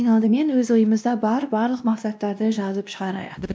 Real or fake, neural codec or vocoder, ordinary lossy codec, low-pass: fake; codec, 16 kHz, 1 kbps, X-Codec, WavLM features, trained on Multilingual LibriSpeech; none; none